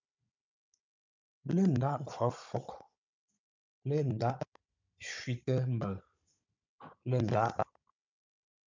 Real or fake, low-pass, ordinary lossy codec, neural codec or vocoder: fake; 7.2 kHz; MP3, 64 kbps; codec, 16 kHz, 16 kbps, FunCodec, trained on Chinese and English, 50 frames a second